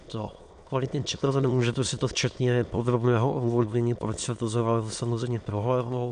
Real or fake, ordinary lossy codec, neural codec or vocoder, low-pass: fake; MP3, 64 kbps; autoencoder, 22.05 kHz, a latent of 192 numbers a frame, VITS, trained on many speakers; 9.9 kHz